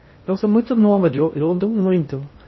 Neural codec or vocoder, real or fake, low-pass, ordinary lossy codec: codec, 16 kHz in and 24 kHz out, 0.6 kbps, FocalCodec, streaming, 2048 codes; fake; 7.2 kHz; MP3, 24 kbps